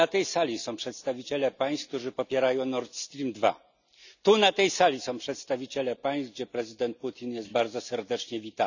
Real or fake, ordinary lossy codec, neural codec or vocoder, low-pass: real; none; none; 7.2 kHz